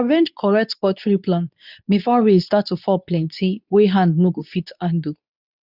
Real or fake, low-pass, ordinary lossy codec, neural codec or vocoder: fake; 5.4 kHz; none; codec, 24 kHz, 0.9 kbps, WavTokenizer, medium speech release version 2